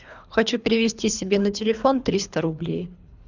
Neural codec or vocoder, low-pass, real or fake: codec, 24 kHz, 3 kbps, HILCodec; 7.2 kHz; fake